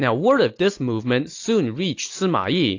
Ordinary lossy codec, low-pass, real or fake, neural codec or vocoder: AAC, 48 kbps; 7.2 kHz; real; none